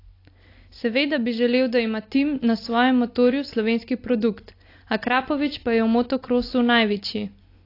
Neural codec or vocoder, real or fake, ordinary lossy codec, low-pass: none; real; AAC, 32 kbps; 5.4 kHz